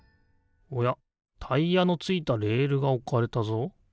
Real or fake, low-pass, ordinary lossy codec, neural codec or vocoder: real; none; none; none